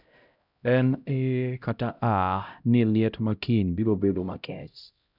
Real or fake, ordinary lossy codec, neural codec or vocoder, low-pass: fake; none; codec, 16 kHz, 0.5 kbps, X-Codec, HuBERT features, trained on LibriSpeech; 5.4 kHz